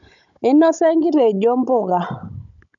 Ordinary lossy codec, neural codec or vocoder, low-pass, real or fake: none; codec, 16 kHz, 16 kbps, FunCodec, trained on Chinese and English, 50 frames a second; 7.2 kHz; fake